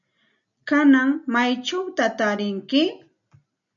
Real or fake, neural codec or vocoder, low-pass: real; none; 7.2 kHz